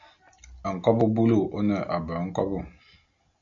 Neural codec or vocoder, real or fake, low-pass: none; real; 7.2 kHz